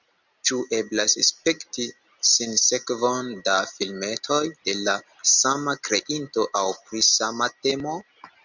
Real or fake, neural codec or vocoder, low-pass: real; none; 7.2 kHz